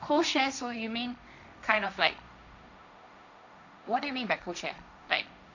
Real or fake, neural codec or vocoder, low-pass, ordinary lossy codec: fake; codec, 16 kHz, 1.1 kbps, Voila-Tokenizer; 7.2 kHz; none